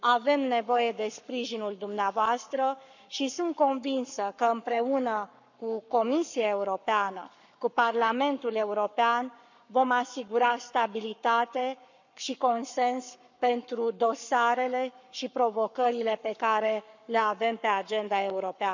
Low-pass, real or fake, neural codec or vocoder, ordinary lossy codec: 7.2 kHz; fake; codec, 44.1 kHz, 7.8 kbps, Pupu-Codec; none